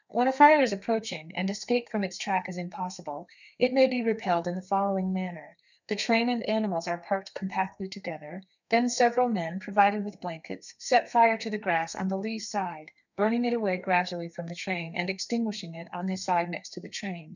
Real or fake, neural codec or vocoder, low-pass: fake; codec, 32 kHz, 1.9 kbps, SNAC; 7.2 kHz